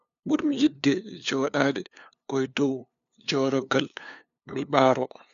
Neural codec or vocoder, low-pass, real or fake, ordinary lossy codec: codec, 16 kHz, 2 kbps, FunCodec, trained on LibriTTS, 25 frames a second; 7.2 kHz; fake; none